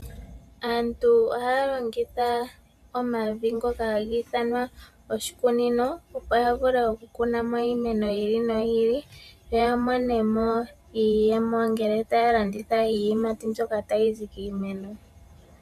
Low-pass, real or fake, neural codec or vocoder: 14.4 kHz; fake; vocoder, 44.1 kHz, 128 mel bands every 512 samples, BigVGAN v2